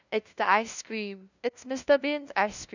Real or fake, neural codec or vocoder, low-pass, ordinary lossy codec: fake; codec, 16 kHz, 0.5 kbps, FunCodec, trained on LibriTTS, 25 frames a second; 7.2 kHz; none